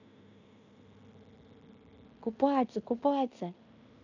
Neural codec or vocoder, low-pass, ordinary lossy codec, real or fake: codec, 16 kHz in and 24 kHz out, 0.9 kbps, LongCat-Audio-Codec, fine tuned four codebook decoder; 7.2 kHz; none; fake